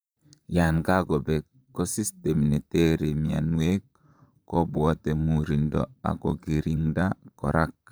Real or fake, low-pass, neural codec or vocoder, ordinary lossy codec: fake; none; vocoder, 44.1 kHz, 128 mel bands, Pupu-Vocoder; none